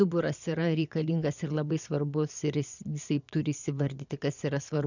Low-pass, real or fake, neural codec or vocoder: 7.2 kHz; fake; vocoder, 22.05 kHz, 80 mel bands, Vocos